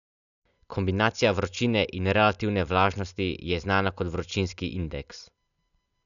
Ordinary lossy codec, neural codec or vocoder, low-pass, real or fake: none; none; 7.2 kHz; real